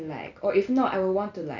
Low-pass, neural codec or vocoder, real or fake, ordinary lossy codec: 7.2 kHz; none; real; none